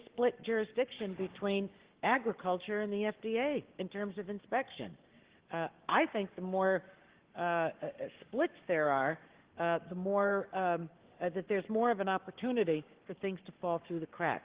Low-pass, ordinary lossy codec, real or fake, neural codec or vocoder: 3.6 kHz; Opus, 16 kbps; fake; codec, 44.1 kHz, 7.8 kbps, Pupu-Codec